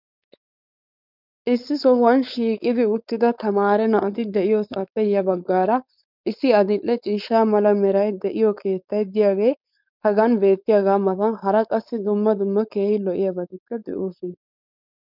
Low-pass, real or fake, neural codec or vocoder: 5.4 kHz; fake; codec, 16 kHz, 4.8 kbps, FACodec